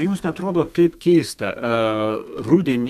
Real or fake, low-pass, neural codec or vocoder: fake; 14.4 kHz; codec, 32 kHz, 1.9 kbps, SNAC